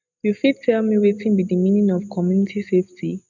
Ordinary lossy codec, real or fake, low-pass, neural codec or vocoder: none; real; 7.2 kHz; none